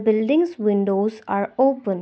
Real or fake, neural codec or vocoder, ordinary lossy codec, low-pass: real; none; none; none